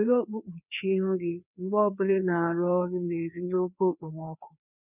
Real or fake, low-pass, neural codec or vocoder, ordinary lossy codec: fake; 3.6 kHz; codec, 16 kHz, 2 kbps, FreqCodec, larger model; none